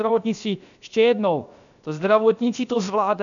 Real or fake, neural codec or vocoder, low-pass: fake; codec, 16 kHz, 0.7 kbps, FocalCodec; 7.2 kHz